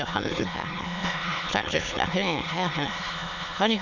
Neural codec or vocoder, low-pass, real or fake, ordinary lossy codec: autoencoder, 22.05 kHz, a latent of 192 numbers a frame, VITS, trained on many speakers; 7.2 kHz; fake; none